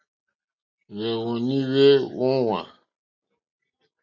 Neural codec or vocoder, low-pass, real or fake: none; 7.2 kHz; real